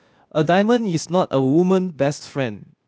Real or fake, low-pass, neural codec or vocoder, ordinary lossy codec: fake; none; codec, 16 kHz, 0.8 kbps, ZipCodec; none